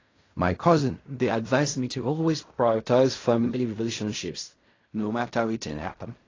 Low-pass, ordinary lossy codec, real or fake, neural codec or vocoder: 7.2 kHz; AAC, 32 kbps; fake; codec, 16 kHz in and 24 kHz out, 0.4 kbps, LongCat-Audio-Codec, fine tuned four codebook decoder